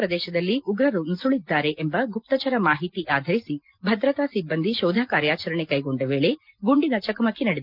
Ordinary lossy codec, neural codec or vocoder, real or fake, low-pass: Opus, 32 kbps; none; real; 5.4 kHz